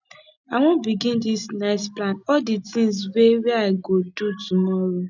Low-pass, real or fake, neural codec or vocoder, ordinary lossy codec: 7.2 kHz; real; none; none